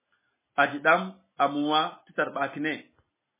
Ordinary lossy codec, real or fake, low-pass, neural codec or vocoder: MP3, 16 kbps; real; 3.6 kHz; none